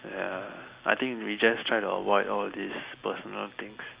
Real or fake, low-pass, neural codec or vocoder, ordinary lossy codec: real; 3.6 kHz; none; Opus, 64 kbps